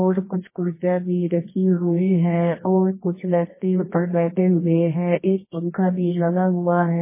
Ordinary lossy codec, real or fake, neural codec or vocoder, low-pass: MP3, 16 kbps; fake; codec, 24 kHz, 0.9 kbps, WavTokenizer, medium music audio release; 3.6 kHz